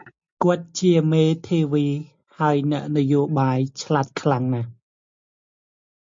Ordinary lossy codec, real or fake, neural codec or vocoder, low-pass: MP3, 48 kbps; real; none; 7.2 kHz